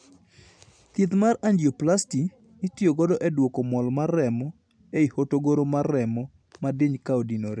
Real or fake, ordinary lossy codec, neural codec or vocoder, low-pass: real; none; none; 9.9 kHz